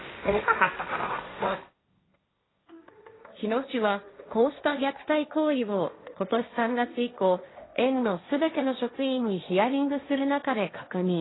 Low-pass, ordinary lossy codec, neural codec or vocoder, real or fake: 7.2 kHz; AAC, 16 kbps; codec, 16 kHz, 1.1 kbps, Voila-Tokenizer; fake